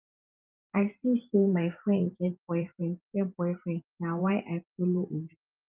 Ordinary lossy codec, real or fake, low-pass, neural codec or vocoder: Opus, 32 kbps; fake; 3.6 kHz; vocoder, 44.1 kHz, 128 mel bands every 512 samples, BigVGAN v2